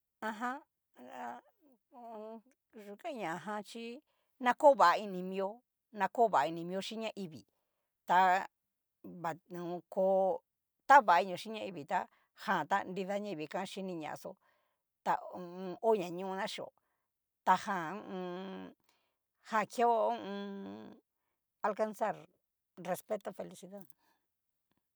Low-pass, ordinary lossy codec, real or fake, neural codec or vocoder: none; none; real; none